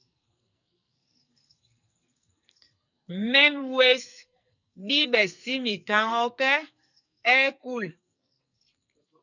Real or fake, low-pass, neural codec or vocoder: fake; 7.2 kHz; codec, 44.1 kHz, 2.6 kbps, SNAC